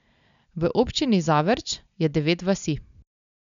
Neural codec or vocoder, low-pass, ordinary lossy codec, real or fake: none; 7.2 kHz; none; real